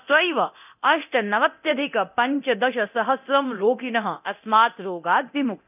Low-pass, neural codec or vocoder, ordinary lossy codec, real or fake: 3.6 kHz; codec, 24 kHz, 0.9 kbps, DualCodec; none; fake